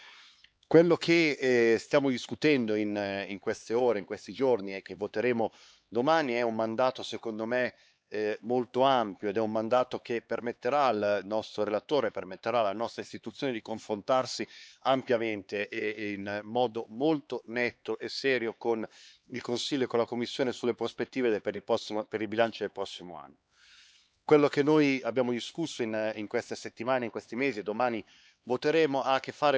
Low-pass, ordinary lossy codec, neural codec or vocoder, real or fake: none; none; codec, 16 kHz, 4 kbps, X-Codec, HuBERT features, trained on LibriSpeech; fake